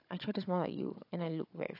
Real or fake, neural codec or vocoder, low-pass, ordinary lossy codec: fake; codec, 16 kHz, 16 kbps, FunCodec, trained on Chinese and English, 50 frames a second; 5.4 kHz; AAC, 32 kbps